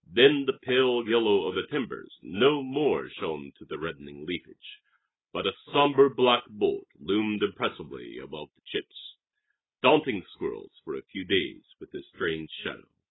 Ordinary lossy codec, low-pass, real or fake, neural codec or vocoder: AAC, 16 kbps; 7.2 kHz; real; none